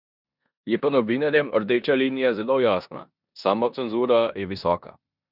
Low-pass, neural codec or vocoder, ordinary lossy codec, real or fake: 5.4 kHz; codec, 16 kHz in and 24 kHz out, 0.9 kbps, LongCat-Audio-Codec, four codebook decoder; none; fake